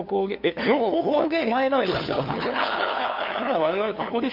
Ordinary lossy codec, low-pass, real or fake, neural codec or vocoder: none; 5.4 kHz; fake; codec, 16 kHz, 2 kbps, FunCodec, trained on LibriTTS, 25 frames a second